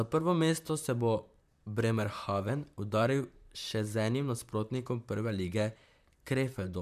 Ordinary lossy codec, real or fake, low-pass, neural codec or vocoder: MP3, 96 kbps; fake; 14.4 kHz; vocoder, 44.1 kHz, 128 mel bands, Pupu-Vocoder